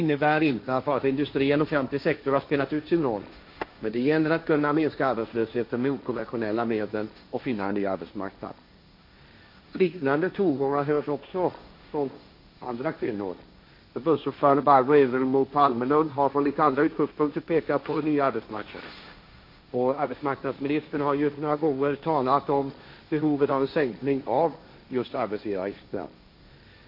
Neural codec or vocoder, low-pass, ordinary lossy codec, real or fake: codec, 16 kHz, 1.1 kbps, Voila-Tokenizer; 5.4 kHz; MP3, 32 kbps; fake